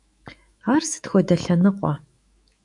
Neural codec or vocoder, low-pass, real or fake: autoencoder, 48 kHz, 128 numbers a frame, DAC-VAE, trained on Japanese speech; 10.8 kHz; fake